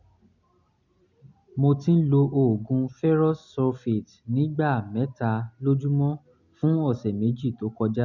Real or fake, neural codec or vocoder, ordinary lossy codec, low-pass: real; none; none; 7.2 kHz